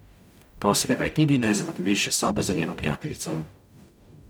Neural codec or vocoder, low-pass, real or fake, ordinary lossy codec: codec, 44.1 kHz, 0.9 kbps, DAC; none; fake; none